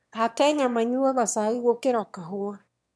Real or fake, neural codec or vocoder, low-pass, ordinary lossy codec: fake; autoencoder, 22.05 kHz, a latent of 192 numbers a frame, VITS, trained on one speaker; none; none